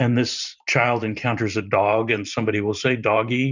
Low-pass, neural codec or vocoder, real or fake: 7.2 kHz; vocoder, 44.1 kHz, 128 mel bands every 512 samples, BigVGAN v2; fake